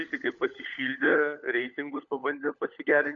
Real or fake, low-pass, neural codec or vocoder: fake; 7.2 kHz; codec, 16 kHz, 16 kbps, FunCodec, trained on LibriTTS, 50 frames a second